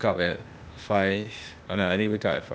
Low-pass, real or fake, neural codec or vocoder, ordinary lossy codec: none; fake; codec, 16 kHz, 0.8 kbps, ZipCodec; none